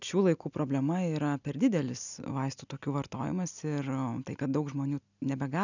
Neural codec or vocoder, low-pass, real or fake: none; 7.2 kHz; real